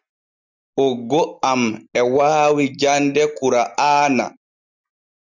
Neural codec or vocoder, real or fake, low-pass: none; real; 7.2 kHz